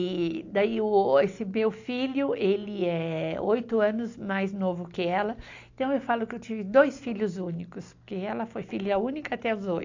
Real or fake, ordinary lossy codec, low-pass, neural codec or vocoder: real; AAC, 48 kbps; 7.2 kHz; none